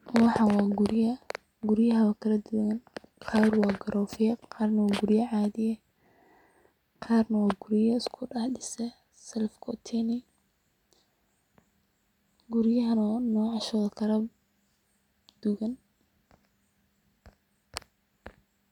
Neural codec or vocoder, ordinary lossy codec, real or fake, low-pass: none; none; real; 19.8 kHz